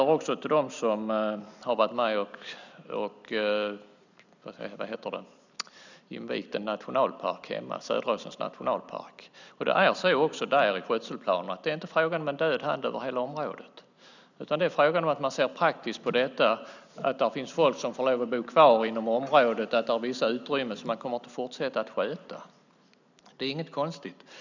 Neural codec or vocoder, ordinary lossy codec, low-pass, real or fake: none; none; 7.2 kHz; real